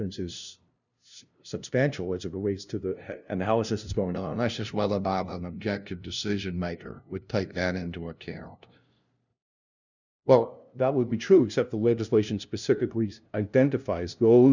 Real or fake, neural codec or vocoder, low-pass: fake; codec, 16 kHz, 0.5 kbps, FunCodec, trained on LibriTTS, 25 frames a second; 7.2 kHz